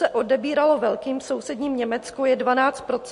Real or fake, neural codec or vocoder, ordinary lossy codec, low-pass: real; none; MP3, 48 kbps; 10.8 kHz